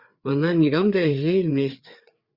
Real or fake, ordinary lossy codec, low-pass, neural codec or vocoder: fake; Opus, 64 kbps; 5.4 kHz; codec, 16 kHz, 4 kbps, FreqCodec, larger model